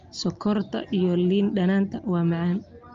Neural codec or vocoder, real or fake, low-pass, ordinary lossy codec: none; real; 7.2 kHz; Opus, 32 kbps